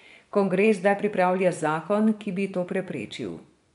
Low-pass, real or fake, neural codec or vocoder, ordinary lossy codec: 10.8 kHz; fake; vocoder, 24 kHz, 100 mel bands, Vocos; none